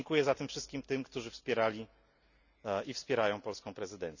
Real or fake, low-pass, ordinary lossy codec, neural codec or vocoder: real; 7.2 kHz; none; none